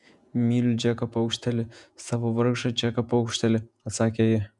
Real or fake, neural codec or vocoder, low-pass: fake; vocoder, 48 kHz, 128 mel bands, Vocos; 10.8 kHz